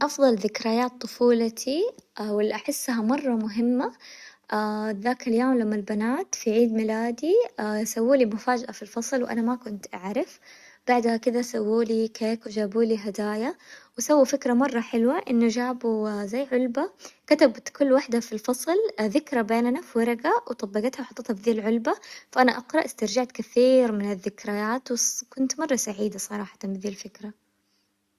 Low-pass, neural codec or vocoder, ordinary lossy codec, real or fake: 14.4 kHz; none; Opus, 64 kbps; real